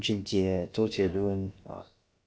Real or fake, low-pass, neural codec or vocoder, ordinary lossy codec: fake; none; codec, 16 kHz, about 1 kbps, DyCAST, with the encoder's durations; none